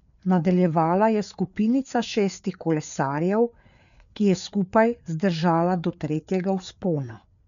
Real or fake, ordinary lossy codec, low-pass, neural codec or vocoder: fake; none; 7.2 kHz; codec, 16 kHz, 4 kbps, FreqCodec, larger model